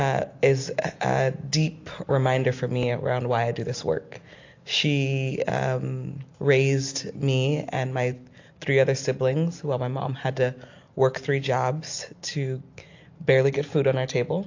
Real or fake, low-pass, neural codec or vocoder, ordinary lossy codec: real; 7.2 kHz; none; AAC, 48 kbps